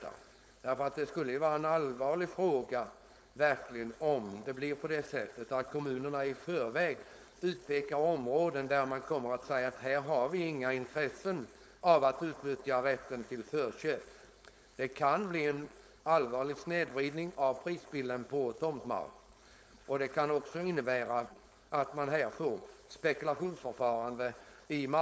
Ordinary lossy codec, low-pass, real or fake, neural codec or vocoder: none; none; fake; codec, 16 kHz, 4.8 kbps, FACodec